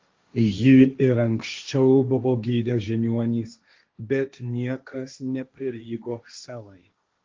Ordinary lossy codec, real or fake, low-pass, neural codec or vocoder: Opus, 32 kbps; fake; 7.2 kHz; codec, 16 kHz, 1.1 kbps, Voila-Tokenizer